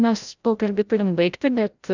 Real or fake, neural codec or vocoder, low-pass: fake; codec, 16 kHz, 0.5 kbps, FreqCodec, larger model; 7.2 kHz